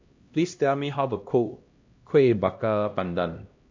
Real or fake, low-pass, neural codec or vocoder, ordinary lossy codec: fake; 7.2 kHz; codec, 16 kHz, 1 kbps, X-Codec, HuBERT features, trained on LibriSpeech; MP3, 48 kbps